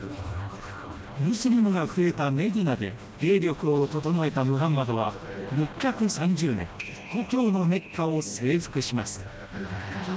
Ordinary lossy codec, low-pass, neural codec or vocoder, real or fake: none; none; codec, 16 kHz, 1 kbps, FreqCodec, smaller model; fake